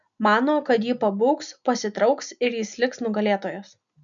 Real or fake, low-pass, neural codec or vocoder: real; 7.2 kHz; none